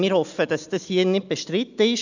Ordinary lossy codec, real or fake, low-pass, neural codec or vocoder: none; real; 7.2 kHz; none